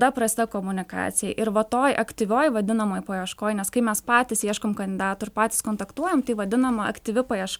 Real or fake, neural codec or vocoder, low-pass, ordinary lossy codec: real; none; 19.8 kHz; MP3, 96 kbps